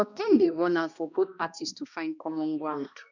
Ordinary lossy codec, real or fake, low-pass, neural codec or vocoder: none; fake; 7.2 kHz; codec, 16 kHz, 1 kbps, X-Codec, HuBERT features, trained on balanced general audio